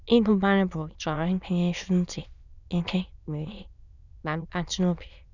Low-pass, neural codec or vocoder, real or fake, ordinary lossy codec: 7.2 kHz; autoencoder, 22.05 kHz, a latent of 192 numbers a frame, VITS, trained on many speakers; fake; none